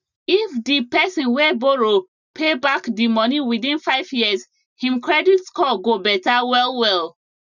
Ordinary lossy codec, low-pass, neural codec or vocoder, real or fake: none; 7.2 kHz; none; real